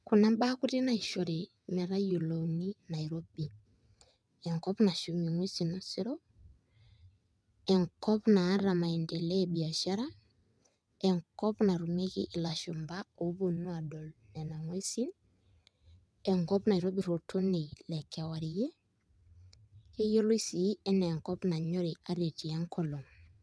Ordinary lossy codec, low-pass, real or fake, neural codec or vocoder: none; none; fake; vocoder, 22.05 kHz, 80 mel bands, WaveNeXt